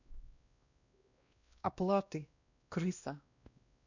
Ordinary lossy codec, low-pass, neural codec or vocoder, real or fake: none; 7.2 kHz; codec, 16 kHz, 1 kbps, X-Codec, WavLM features, trained on Multilingual LibriSpeech; fake